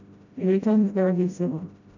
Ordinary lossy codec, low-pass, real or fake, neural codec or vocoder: none; 7.2 kHz; fake; codec, 16 kHz, 0.5 kbps, FreqCodec, smaller model